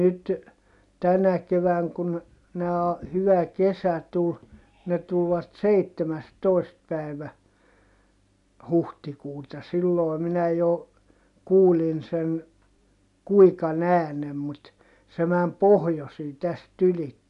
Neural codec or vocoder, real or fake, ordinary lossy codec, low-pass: none; real; none; 10.8 kHz